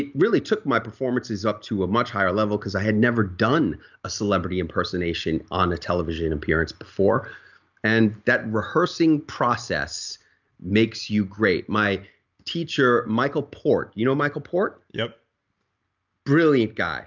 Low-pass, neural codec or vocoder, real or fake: 7.2 kHz; none; real